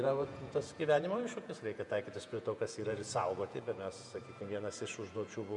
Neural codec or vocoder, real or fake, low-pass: vocoder, 48 kHz, 128 mel bands, Vocos; fake; 10.8 kHz